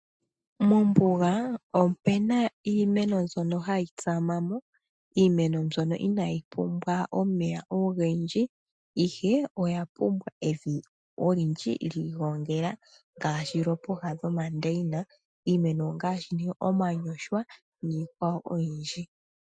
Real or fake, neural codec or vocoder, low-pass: real; none; 9.9 kHz